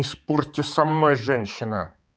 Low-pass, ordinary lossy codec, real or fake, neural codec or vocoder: none; none; fake; codec, 16 kHz, 4 kbps, X-Codec, HuBERT features, trained on balanced general audio